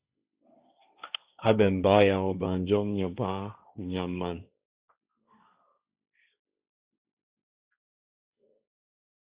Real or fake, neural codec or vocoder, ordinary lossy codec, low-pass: fake; codec, 16 kHz, 1.1 kbps, Voila-Tokenizer; Opus, 64 kbps; 3.6 kHz